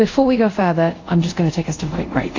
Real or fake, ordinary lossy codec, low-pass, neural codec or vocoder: fake; AAC, 48 kbps; 7.2 kHz; codec, 24 kHz, 0.5 kbps, DualCodec